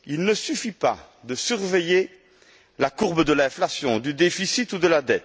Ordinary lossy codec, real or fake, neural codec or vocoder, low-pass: none; real; none; none